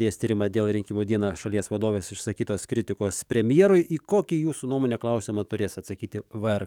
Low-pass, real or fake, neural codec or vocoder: 19.8 kHz; fake; codec, 44.1 kHz, 7.8 kbps, DAC